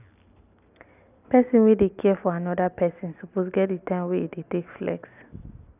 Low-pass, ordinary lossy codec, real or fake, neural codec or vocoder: 3.6 kHz; none; real; none